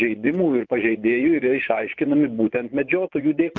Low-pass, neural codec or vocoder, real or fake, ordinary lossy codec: 7.2 kHz; vocoder, 24 kHz, 100 mel bands, Vocos; fake; Opus, 32 kbps